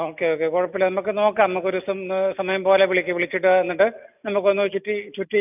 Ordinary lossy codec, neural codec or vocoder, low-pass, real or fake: none; none; 3.6 kHz; real